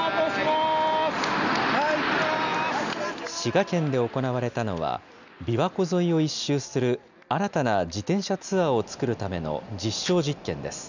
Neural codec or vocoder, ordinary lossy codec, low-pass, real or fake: none; none; 7.2 kHz; real